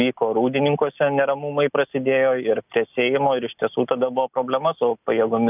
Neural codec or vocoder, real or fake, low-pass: none; real; 3.6 kHz